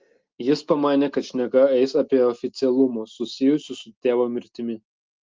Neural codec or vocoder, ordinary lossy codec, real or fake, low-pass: none; Opus, 32 kbps; real; 7.2 kHz